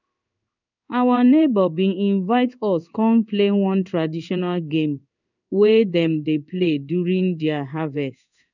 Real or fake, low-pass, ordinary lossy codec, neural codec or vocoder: fake; 7.2 kHz; none; codec, 16 kHz in and 24 kHz out, 1 kbps, XY-Tokenizer